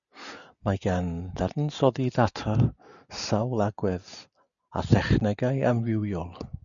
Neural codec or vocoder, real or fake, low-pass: none; real; 7.2 kHz